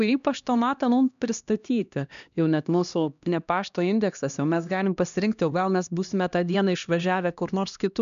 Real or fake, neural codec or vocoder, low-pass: fake; codec, 16 kHz, 1 kbps, X-Codec, HuBERT features, trained on LibriSpeech; 7.2 kHz